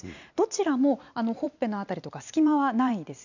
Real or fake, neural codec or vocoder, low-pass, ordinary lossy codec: fake; vocoder, 44.1 kHz, 128 mel bands every 512 samples, BigVGAN v2; 7.2 kHz; none